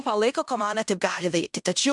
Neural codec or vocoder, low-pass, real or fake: codec, 16 kHz in and 24 kHz out, 0.9 kbps, LongCat-Audio-Codec, fine tuned four codebook decoder; 10.8 kHz; fake